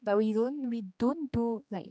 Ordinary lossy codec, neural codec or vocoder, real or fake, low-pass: none; codec, 16 kHz, 2 kbps, X-Codec, HuBERT features, trained on general audio; fake; none